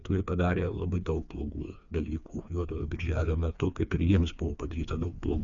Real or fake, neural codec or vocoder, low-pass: fake; codec, 16 kHz, 4 kbps, FreqCodec, smaller model; 7.2 kHz